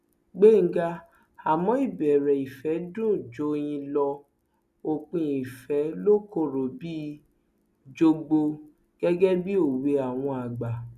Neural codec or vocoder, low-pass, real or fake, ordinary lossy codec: none; 14.4 kHz; real; none